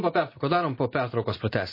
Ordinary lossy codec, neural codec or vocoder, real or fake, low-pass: MP3, 24 kbps; none; real; 5.4 kHz